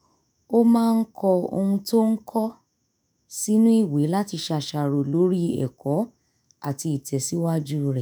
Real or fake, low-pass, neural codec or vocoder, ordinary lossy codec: fake; none; autoencoder, 48 kHz, 128 numbers a frame, DAC-VAE, trained on Japanese speech; none